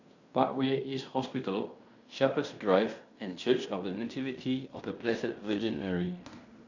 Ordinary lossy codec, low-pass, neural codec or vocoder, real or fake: none; 7.2 kHz; codec, 16 kHz in and 24 kHz out, 0.9 kbps, LongCat-Audio-Codec, fine tuned four codebook decoder; fake